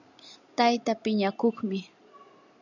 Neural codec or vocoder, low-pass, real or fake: none; 7.2 kHz; real